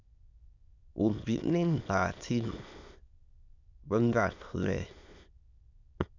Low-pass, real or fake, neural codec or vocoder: 7.2 kHz; fake; autoencoder, 22.05 kHz, a latent of 192 numbers a frame, VITS, trained on many speakers